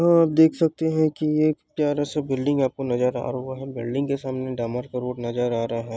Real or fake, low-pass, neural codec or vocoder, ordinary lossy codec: real; none; none; none